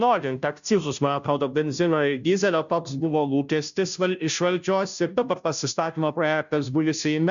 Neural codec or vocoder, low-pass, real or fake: codec, 16 kHz, 0.5 kbps, FunCodec, trained on Chinese and English, 25 frames a second; 7.2 kHz; fake